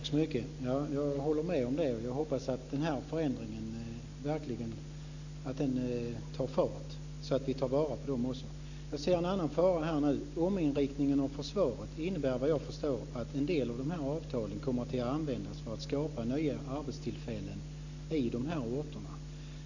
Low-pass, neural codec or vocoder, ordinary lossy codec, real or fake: 7.2 kHz; none; none; real